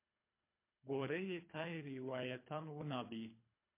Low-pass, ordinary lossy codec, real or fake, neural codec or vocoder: 3.6 kHz; MP3, 24 kbps; fake; codec, 24 kHz, 3 kbps, HILCodec